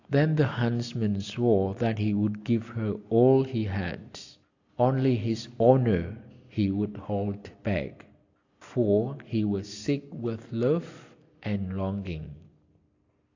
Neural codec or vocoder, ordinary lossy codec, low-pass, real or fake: none; AAC, 48 kbps; 7.2 kHz; real